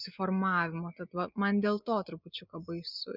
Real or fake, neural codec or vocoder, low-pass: real; none; 5.4 kHz